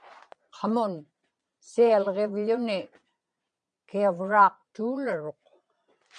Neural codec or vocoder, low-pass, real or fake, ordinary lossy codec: vocoder, 22.05 kHz, 80 mel bands, Vocos; 9.9 kHz; fake; MP3, 64 kbps